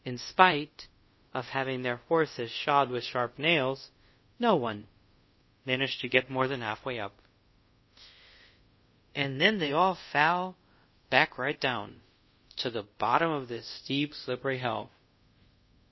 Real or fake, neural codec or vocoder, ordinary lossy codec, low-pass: fake; codec, 24 kHz, 0.5 kbps, DualCodec; MP3, 24 kbps; 7.2 kHz